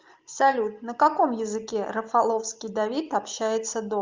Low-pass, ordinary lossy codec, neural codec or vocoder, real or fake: 7.2 kHz; Opus, 24 kbps; none; real